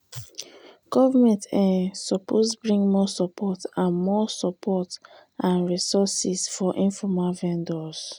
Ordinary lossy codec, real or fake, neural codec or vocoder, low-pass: none; real; none; 19.8 kHz